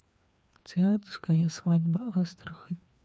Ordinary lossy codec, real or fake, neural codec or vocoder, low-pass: none; fake; codec, 16 kHz, 2 kbps, FreqCodec, larger model; none